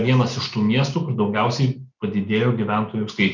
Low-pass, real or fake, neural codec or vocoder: 7.2 kHz; real; none